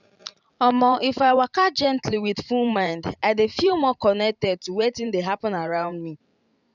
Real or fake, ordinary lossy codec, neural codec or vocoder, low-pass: fake; none; vocoder, 44.1 kHz, 128 mel bands every 512 samples, BigVGAN v2; 7.2 kHz